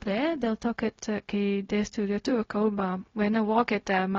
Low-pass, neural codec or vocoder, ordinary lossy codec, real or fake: 7.2 kHz; codec, 16 kHz, 0.4 kbps, LongCat-Audio-Codec; AAC, 32 kbps; fake